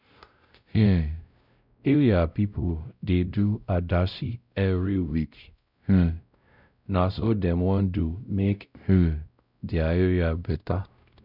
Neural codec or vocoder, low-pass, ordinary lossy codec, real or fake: codec, 16 kHz, 0.5 kbps, X-Codec, WavLM features, trained on Multilingual LibriSpeech; 5.4 kHz; none; fake